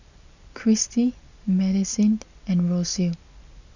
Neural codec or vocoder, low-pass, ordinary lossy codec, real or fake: none; 7.2 kHz; none; real